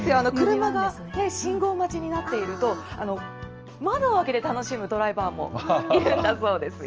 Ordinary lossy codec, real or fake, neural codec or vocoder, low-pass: Opus, 24 kbps; real; none; 7.2 kHz